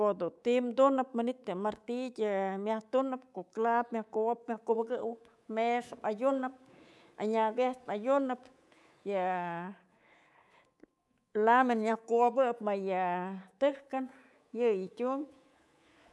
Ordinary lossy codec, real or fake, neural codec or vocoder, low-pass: none; fake; codec, 24 kHz, 3.1 kbps, DualCodec; none